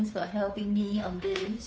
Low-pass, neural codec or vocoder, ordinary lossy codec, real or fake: none; codec, 16 kHz, 2 kbps, FunCodec, trained on Chinese and English, 25 frames a second; none; fake